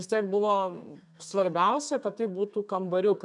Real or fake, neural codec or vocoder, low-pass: fake; codec, 32 kHz, 1.9 kbps, SNAC; 10.8 kHz